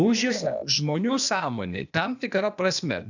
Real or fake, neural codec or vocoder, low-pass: fake; codec, 16 kHz, 0.8 kbps, ZipCodec; 7.2 kHz